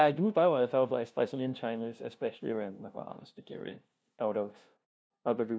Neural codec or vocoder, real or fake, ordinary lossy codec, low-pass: codec, 16 kHz, 0.5 kbps, FunCodec, trained on LibriTTS, 25 frames a second; fake; none; none